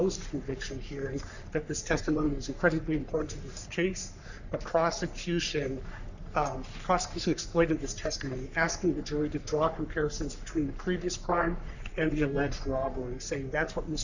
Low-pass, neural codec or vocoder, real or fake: 7.2 kHz; codec, 44.1 kHz, 3.4 kbps, Pupu-Codec; fake